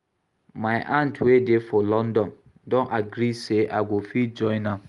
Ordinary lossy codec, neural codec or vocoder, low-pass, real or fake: Opus, 32 kbps; none; 10.8 kHz; real